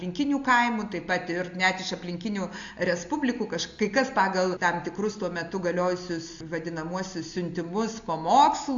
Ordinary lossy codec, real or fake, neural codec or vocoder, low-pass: AAC, 64 kbps; real; none; 7.2 kHz